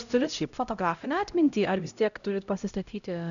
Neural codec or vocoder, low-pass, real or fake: codec, 16 kHz, 0.5 kbps, X-Codec, HuBERT features, trained on LibriSpeech; 7.2 kHz; fake